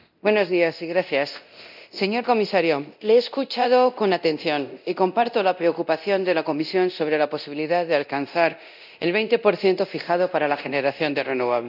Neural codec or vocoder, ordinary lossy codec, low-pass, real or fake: codec, 24 kHz, 0.9 kbps, DualCodec; none; 5.4 kHz; fake